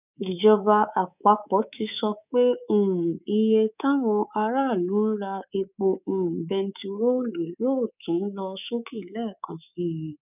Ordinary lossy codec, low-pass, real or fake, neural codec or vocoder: none; 3.6 kHz; fake; codec, 24 kHz, 3.1 kbps, DualCodec